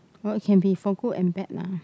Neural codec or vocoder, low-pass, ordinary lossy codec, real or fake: none; none; none; real